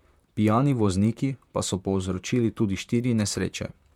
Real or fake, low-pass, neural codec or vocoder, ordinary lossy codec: fake; 19.8 kHz; vocoder, 44.1 kHz, 128 mel bands, Pupu-Vocoder; MP3, 96 kbps